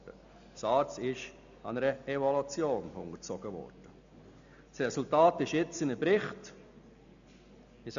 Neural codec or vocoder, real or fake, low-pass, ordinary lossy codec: none; real; 7.2 kHz; none